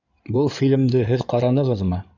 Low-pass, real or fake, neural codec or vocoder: 7.2 kHz; fake; codec, 16 kHz in and 24 kHz out, 2.2 kbps, FireRedTTS-2 codec